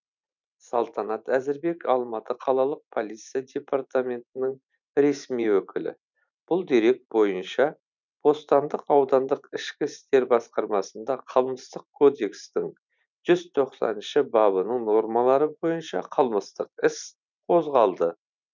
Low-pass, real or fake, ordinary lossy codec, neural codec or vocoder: 7.2 kHz; fake; none; vocoder, 44.1 kHz, 128 mel bands every 512 samples, BigVGAN v2